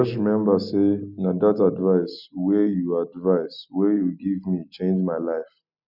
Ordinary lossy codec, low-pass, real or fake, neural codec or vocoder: none; 5.4 kHz; real; none